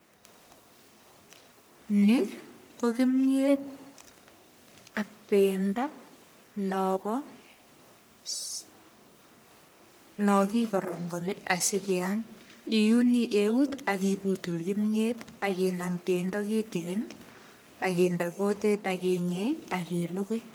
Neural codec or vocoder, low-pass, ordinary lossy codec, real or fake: codec, 44.1 kHz, 1.7 kbps, Pupu-Codec; none; none; fake